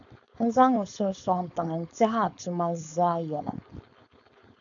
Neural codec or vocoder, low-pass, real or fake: codec, 16 kHz, 4.8 kbps, FACodec; 7.2 kHz; fake